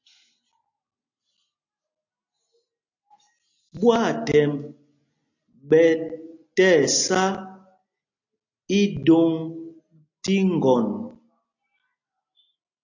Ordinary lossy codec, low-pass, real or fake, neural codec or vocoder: AAC, 48 kbps; 7.2 kHz; real; none